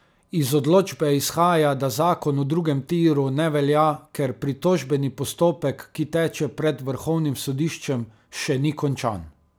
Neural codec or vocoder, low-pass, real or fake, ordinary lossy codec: none; none; real; none